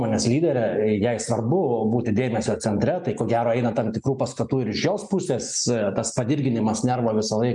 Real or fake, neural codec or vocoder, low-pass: real; none; 10.8 kHz